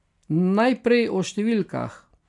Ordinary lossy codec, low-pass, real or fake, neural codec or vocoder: none; 10.8 kHz; real; none